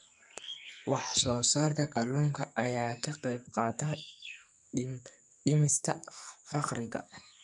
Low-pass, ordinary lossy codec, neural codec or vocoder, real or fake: 10.8 kHz; none; codec, 44.1 kHz, 2.6 kbps, SNAC; fake